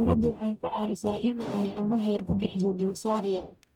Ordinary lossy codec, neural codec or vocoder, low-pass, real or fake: none; codec, 44.1 kHz, 0.9 kbps, DAC; 19.8 kHz; fake